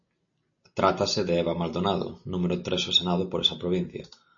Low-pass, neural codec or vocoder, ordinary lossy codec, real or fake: 7.2 kHz; none; MP3, 32 kbps; real